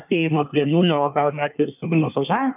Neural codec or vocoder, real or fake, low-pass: codec, 24 kHz, 1 kbps, SNAC; fake; 3.6 kHz